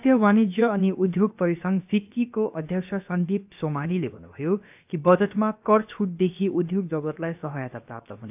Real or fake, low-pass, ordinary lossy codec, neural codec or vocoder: fake; 3.6 kHz; AAC, 32 kbps; codec, 16 kHz, about 1 kbps, DyCAST, with the encoder's durations